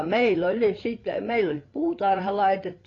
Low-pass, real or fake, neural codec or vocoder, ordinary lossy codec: 7.2 kHz; fake; codec, 16 kHz, 8 kbps, FreqCodec, larger model; AAC, 32 kbps